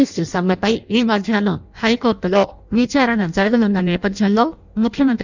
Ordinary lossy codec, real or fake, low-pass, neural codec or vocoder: none; fake; 7.2 kHz; codec, 16 kHz in and 24 kHz out, 0.6 kbps, FireRedTTS-2 codec